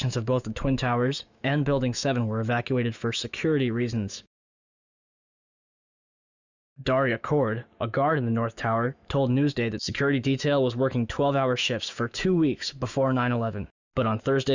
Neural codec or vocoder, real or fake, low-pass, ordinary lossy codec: autoencoder, 48 kHz, 128 numbers a frame, DAC-VAE, trained on Japanese speech; fake; 7.2 kHz; Opus, 64 kbps